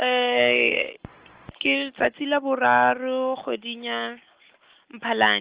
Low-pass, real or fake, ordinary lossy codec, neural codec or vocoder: 3.6 kHz; real; Opus, 16 kbps; none